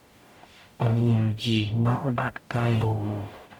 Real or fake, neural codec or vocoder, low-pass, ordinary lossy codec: fake; codec, 44.1 kHz, 0.9 kbps, DAC; 19.8 kHz; none